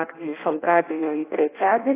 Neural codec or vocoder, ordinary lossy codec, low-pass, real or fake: codec, 16 kHz in and 24 kHz out, 0.6 kbps, FireRedTTS-2 codec; AAC, 24 kbps; 3.6 kHz; fake